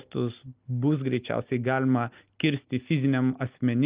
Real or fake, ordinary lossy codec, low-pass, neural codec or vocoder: real; Opus, 32 kbps; 3.6 kHz; none